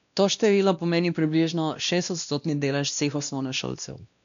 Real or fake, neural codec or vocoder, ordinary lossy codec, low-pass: fake; codec, 16 kHz, 1 kbps, X-Codec, WavLM features, trained on Multilingual LibriSpeech; none; 7.2 kHz